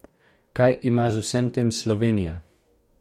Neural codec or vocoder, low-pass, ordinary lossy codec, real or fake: codec, 44.1 kHz, 2.6 kbps, DAC; 19.8 kHz; MP3, 64 kbps; fake